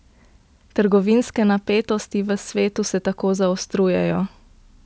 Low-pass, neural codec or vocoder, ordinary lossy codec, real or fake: none; none; none; real